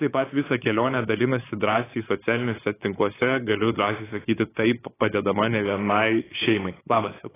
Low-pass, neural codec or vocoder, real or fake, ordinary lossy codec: 3.6 kHz; codec, 16 kHz, 8 kbps, FunCodec, trained on LibriTTS, 25 frames a second; fake; AAC, 16 kbps